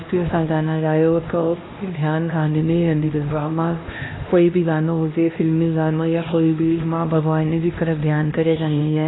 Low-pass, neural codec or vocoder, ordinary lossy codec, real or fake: 7.2 kHz; codec, 16 kHz, 1 kbps, X-Codec, HuBERT features, trained on LibriSpeech; AAC, 16 kbps; fake